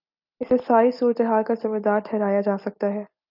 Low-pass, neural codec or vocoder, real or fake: 5.4 kHz; none; real